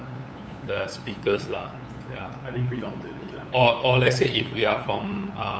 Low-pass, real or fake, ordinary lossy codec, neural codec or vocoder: none; fake; none; codec, 16 kHz, 8 kbps, FunCodec, trained on LibriTTS, 25 frames a second